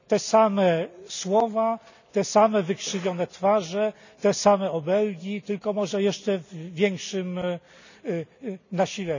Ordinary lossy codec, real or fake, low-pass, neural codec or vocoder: none; real; 7.2 kHz; none